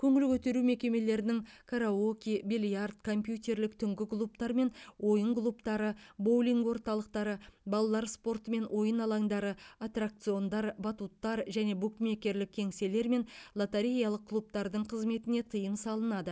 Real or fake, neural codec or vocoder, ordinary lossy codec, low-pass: real; none; none; none